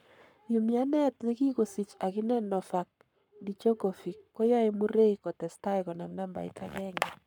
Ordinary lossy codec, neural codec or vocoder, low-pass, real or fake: none; codec, 44.1 kHz, 7.8 kbps, Pupu-Codec; 19.8 kHz; fake